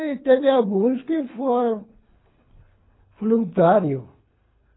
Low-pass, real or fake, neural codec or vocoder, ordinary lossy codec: 7.2 kHz; fake; codec, 24 kHz, 3 kbps, HILCodec; AAC, 16 kbps